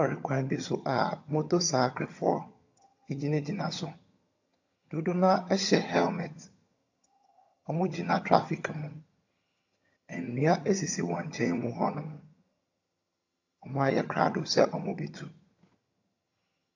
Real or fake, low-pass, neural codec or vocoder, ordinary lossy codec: fake; 7.2 kHz; vocoder, 22.05 kHz, 80 mel bands, HiFi-GAN; AAC, 48 kbps